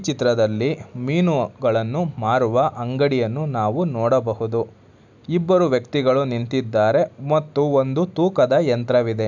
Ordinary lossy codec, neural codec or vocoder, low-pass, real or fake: none; none; 7.2 kHz; real